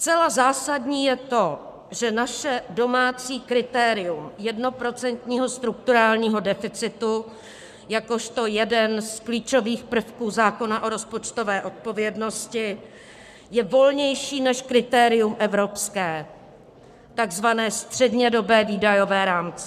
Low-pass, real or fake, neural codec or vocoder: 14.4 kHz; fake; codec, 44.1 kHz, 7.8 kbps, Pupu-Codec